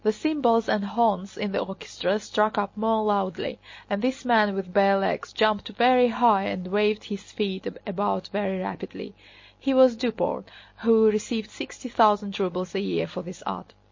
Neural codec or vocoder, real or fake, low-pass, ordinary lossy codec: none; real; 7.2 kHz; MP3, 32 kbps